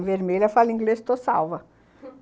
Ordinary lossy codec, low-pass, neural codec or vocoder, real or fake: none; none; none; real